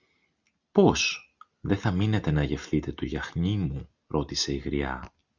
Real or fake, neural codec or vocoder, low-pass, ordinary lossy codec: real; none; 7.2 kHz; Opus, 64 kbps